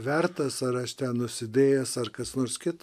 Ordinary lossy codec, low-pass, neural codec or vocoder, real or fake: MP3, 96 kbps; 14.4 kHz; none; real